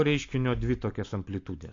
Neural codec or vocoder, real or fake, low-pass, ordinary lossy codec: none; real; 7.2 kHz; AAC, 48 kbps